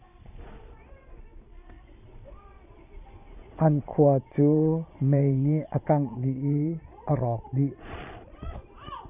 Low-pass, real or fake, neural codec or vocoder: 3.6 kHz; fake; vocoder, 22.05 kHz, 80 mel bands, Vocos